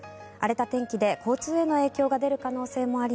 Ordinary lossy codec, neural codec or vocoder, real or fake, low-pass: none; none; real; none